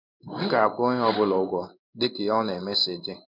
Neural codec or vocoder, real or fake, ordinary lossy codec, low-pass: codec, 16 kHz in and 24 kHz out, 1 kbps, XY-Tokenizer; fake; none; 5.4 kHz